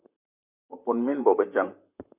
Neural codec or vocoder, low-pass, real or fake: vocoder, 44.1 kHz, 128 mel bands, Pupu-Vocoder; 3.6 kHz; fake